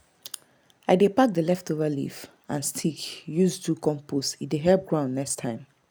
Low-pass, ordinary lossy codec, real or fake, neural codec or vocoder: none; none; real; none